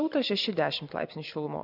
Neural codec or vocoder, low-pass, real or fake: none; 5.4 kHz; real